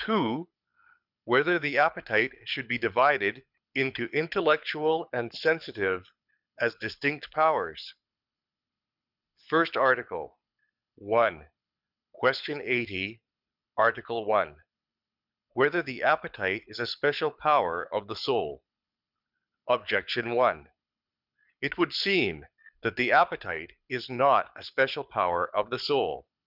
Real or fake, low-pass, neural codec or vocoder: fake; 5.4 kHz; codec, 24 kHz, 6 kbps, HILCodec